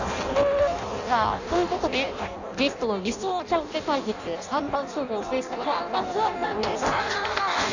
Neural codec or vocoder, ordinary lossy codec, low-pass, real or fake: codec, 16 kHz in and 24 kHz out, 0.6 kbps, FireRedTTS-2 codec; none; 7.2 kHz; fake